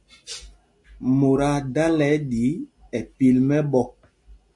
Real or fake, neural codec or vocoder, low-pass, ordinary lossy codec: real; none; 10.8 kHz; MP3, 48 kbps